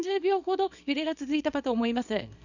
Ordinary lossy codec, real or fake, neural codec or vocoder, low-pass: none; fake; codec, 24 kHz, 0.9 kbps, WavTokenizer, small release; 7.2 kHz